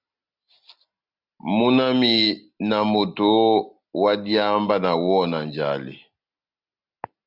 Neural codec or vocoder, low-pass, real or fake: none; 5.4 kHz; real